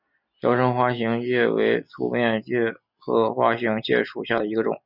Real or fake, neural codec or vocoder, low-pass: real; none; 5.4 kHz